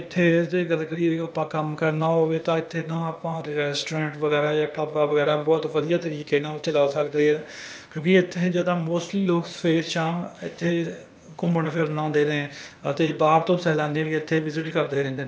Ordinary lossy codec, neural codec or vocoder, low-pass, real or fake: none; codec, 16 kHz, 0.8 kbps, ZipCodec; none; fake